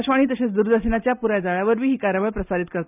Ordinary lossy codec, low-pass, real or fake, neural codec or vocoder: none; 3.6 kHz; real; none